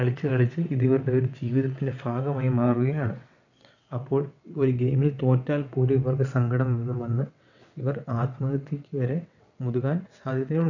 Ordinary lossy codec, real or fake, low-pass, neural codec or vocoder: none; fake; 7.2 kHz; vocoder, 22.05 kHz, 80 mel bands, WaveNeXt